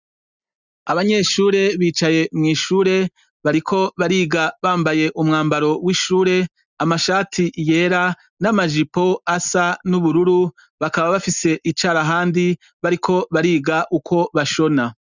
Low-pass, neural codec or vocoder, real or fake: 7.2 kHz; none; real